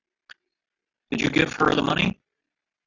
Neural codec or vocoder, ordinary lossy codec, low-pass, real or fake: none; Opus, 64 kbps; 7.2 kHz; real